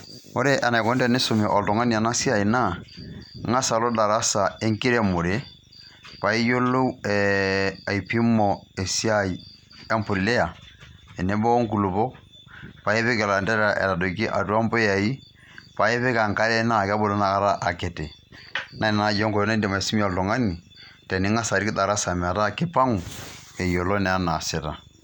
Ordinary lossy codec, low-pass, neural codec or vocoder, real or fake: none; 19.8 kHz; none; real